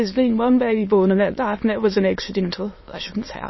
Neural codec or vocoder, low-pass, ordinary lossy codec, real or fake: autoencoder, 22.05 kHz, a latent of 192 numbers a frame, VITS, trained on many speakers; 7.2 kHz; MP3, 24 kbps; fake